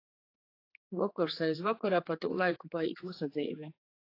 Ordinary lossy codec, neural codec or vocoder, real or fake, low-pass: AAC, 32 kbps; codec, 16 kHz, 4 kbps, X-Codec, HuBERT features, trained on general audio; fake; 5.4 kHz